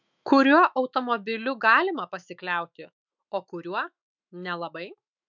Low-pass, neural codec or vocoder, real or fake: 7.2 kHz; autoencoder, 48 kHz, 128 numbers a frame, DAC-VAE, trained on Japanese speech; fake